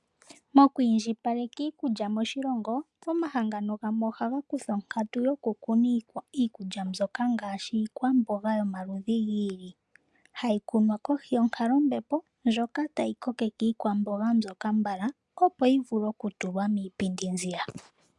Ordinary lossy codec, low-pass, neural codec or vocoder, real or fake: MP3, 96 kbps; 10.8 kHz; none; real